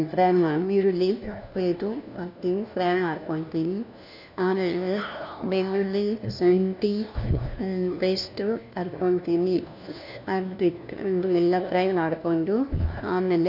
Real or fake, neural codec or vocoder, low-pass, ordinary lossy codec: fake; codec, 16 kHz, 1 kbps, FunCodec, trained on LibriTTS, 50 frames a second; 5.4 kHz; none